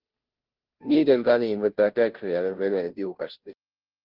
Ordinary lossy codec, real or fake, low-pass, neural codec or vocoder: Opus, 16 kbps; fake; 5.4 kHz; codec, 16 kHz, 0.5 kbps, FunCodec, trained on Chinese and English, 25 frames a second